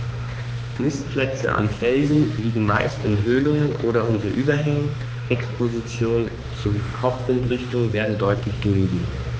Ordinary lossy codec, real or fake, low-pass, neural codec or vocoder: none; fake; none; codec, 16 kHz, 2 kbps, X-Codec, HuBERT features, trained on balanced general audio